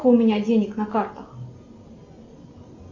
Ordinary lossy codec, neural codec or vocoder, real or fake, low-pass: AAC, 48 kbps; none; real; 7.2 kHz